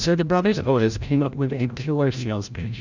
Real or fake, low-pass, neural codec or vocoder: fake; 7.2 kHz; codec, 16 kHz, 0.5 kbps, FreqCodec, larger model